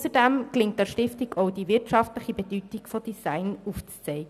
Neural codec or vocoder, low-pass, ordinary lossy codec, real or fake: none; 14.4 kHz; none; real